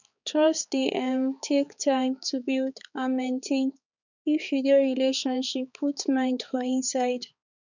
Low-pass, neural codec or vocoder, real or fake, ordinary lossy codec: 7.2 kHz; codec, 16 kHz, 4 kbps, X-Codec, HuBERT features, trained on balanced general audio; fake; none